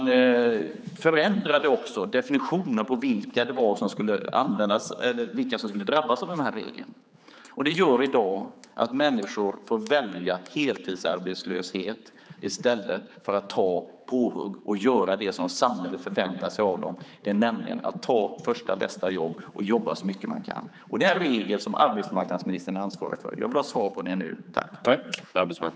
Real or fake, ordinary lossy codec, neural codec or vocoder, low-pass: fake; none; codec, 16 kHz, 4 kbps, X-Codec, HuBERT features, trained on general audio; none